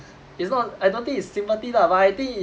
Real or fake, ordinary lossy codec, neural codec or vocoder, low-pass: real; none; none; none